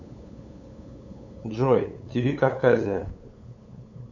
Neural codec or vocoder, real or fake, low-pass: codec, 16 kHz, 8 kbps, FunCodec, trained on LibriTTS, 25 frames a second; fake; 7.2 kHz